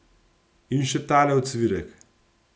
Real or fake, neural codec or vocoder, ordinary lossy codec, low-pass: real; none; none; none